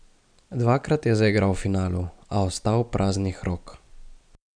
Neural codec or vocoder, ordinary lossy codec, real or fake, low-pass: none; none; real; 9.9 kHz